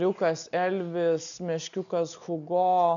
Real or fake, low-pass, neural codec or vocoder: real; 7.2 kHz; none